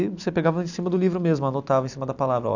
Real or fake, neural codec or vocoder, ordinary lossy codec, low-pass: real; none; none; 7.2 kHz